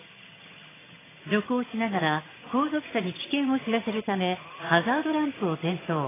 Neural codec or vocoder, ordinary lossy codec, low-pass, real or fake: vocoder, 22.05 kHz, 80 mel bands, HiFi-GAN; AAC, 16 kbps; 3.6 kHz; fake